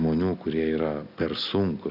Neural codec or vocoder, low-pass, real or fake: none; 5.4 kHz; real